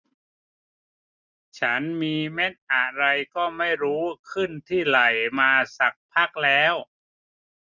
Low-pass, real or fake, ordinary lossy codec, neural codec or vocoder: 7.2 kHz; real; none; none